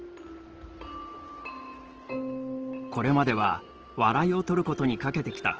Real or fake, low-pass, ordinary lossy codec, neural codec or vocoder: real; 7.2 kHz; Opus, 16 kbps; none